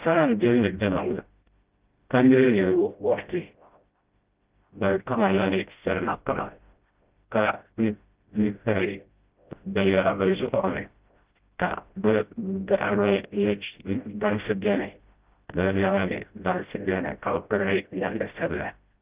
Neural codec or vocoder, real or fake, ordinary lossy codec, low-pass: codec, 16 kHz, 0.5 kbps, FreqCodec, smaller model; fake; Opus, 32 kbps; 3.6 kHz